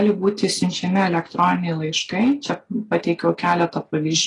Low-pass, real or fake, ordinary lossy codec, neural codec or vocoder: 10.8 kHz; real; AAC, 32 kbps; none